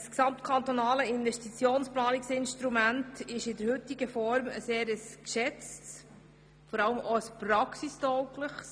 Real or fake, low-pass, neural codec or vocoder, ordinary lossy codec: real; none; none; none